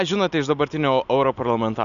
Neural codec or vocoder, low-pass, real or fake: none; 7.2 kHz; real